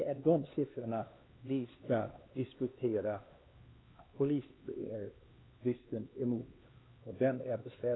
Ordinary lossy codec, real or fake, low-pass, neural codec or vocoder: AAC, 16 kbps; fake; 7.2 kHz; codec, 16 kHz, 2 kbps, X-Codec, HuBERT features, trained on LibriSpeech